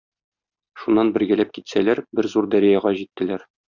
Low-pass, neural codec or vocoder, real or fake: 7.2 kHz; none; real